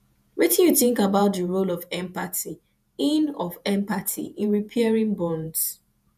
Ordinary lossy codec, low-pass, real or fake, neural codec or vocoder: none; 14.4 kHz; fake; vocoder, 48 kHz, 128 mel bands, Vocos